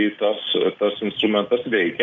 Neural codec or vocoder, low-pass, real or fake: none; 7.2 kHz; real